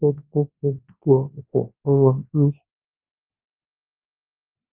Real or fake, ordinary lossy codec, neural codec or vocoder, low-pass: fake; Opus, 32 kbps; codec, 24 kHz, 0.9 kbps, WavTokenizer, large speech release; 3.6 kHz